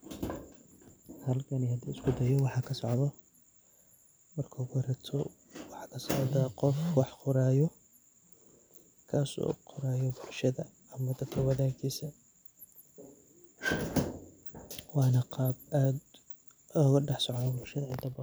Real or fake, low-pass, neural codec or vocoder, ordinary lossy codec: real; none; none; none